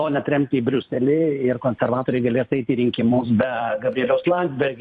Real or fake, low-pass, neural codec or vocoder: fake; 10.8 kHz; vocoder, 44.1 kHz, 128 mel bands every 256 samples, BigVGAN v2